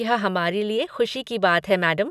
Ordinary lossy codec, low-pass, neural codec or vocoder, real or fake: none; 14.4 kHz; none; real